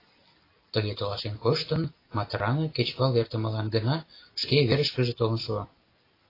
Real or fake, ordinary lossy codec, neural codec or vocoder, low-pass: real; AAC, 24 kbps; none; 5.4 kHz